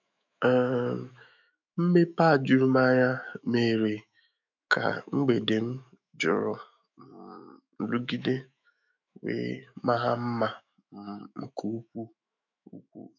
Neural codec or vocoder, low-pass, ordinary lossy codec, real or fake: autoencoder, 48 kHz, 128 numbers a frame, DAC-VAE, trained on Japanese speech; 7.2 kHz; none; fake